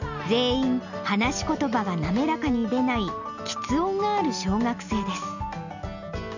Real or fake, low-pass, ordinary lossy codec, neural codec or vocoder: real; 7.2 kHz; none; none